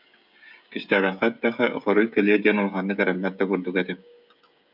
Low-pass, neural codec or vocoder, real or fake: 5.4 kHz; codec, 16 kHz, 16 kbps, FreqCodec, smaller model; fake